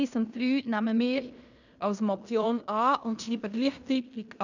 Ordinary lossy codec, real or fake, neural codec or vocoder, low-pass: none; fake; codec, 16 kHz in and 24 kHz out, 0.9 kbps, LongCat-Audio-Codec, fine tuned four codebook decoder; 7.2 kHz